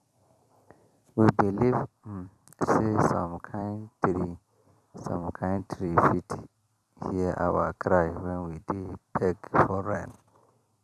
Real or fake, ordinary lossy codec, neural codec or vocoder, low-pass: fake; none; vocoder, 44.1 kHz, 128 mel bands every 256 samples, BigVGAN v2; 14.4 kHz